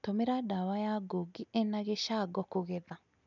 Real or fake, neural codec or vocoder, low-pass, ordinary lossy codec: real; none; 7.2 kHz; none